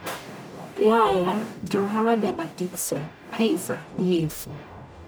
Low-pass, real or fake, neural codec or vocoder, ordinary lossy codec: none; fake; codec, 44.1 kHz, 0.9 kbps, DAC; none